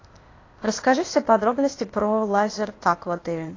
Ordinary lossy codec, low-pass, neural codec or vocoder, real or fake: AAC, 32 kbps; 7.2 kHz; codec, 16 kHz, 0.8 kbps, ZipCodec; fake